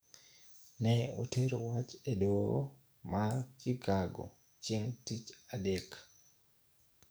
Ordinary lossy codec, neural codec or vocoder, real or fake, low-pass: none; codec, 44.1 kHz, 7.8 kbps, DAC; fake; none